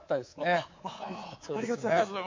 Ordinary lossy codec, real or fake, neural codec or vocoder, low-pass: MP3, 48 kbps; fake; codec, 24 kHz, 3.1 kbps, DualCodec; 7.2 kHz